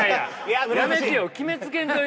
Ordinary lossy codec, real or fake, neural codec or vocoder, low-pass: none; real; none; none